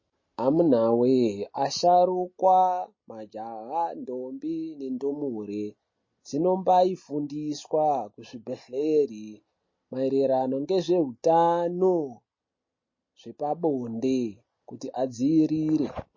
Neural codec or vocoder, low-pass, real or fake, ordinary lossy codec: none; 7.2 kHz; real; MP3, 32 kbps